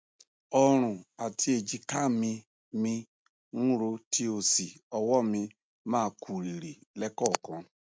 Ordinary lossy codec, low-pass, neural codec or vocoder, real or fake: none; none; none; real